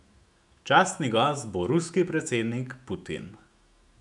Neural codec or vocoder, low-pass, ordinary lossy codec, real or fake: autoencoder, 48 kHz, 128 numbers a frame, DAC-VAE, trained on Japanese speech; 10.8 kHz; none; fake